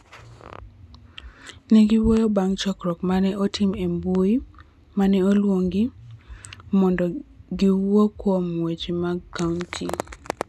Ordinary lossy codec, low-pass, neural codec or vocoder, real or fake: none; none; none; real